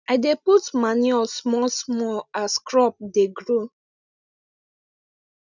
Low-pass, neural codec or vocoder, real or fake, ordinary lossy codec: 7.2 kHz; none; real; none